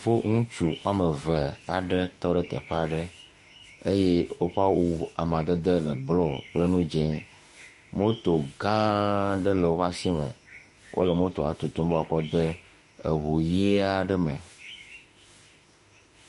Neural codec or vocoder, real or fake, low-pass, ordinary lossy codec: autoencoder, 48 kHz, 32 numbers a frame, DAC-VAE, trained on Japanese speech; fake; 14.4 kHz; MP3, 48 kbps